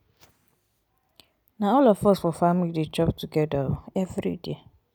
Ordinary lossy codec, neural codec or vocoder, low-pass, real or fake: none; none; none; real